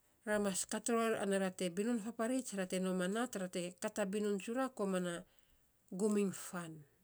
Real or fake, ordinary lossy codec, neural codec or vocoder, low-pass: real; none; none; none